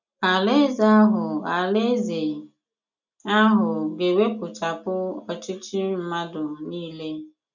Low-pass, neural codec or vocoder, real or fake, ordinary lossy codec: 7.2 kHz; none; real; none